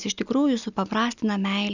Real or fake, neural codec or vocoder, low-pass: real; none; 7.2 kHz